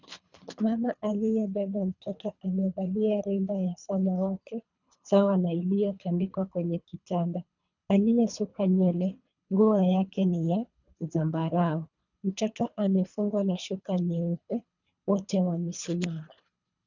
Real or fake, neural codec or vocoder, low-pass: fake; codec, 24 kHz, 3 kbps, HILCodec; 7.2 kHz